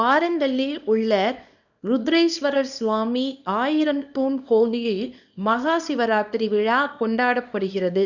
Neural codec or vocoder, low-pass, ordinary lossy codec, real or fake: codec, 24 kHz, 0.9 kbps, WavTokenizer, small release; 7.2 kHz; none; fake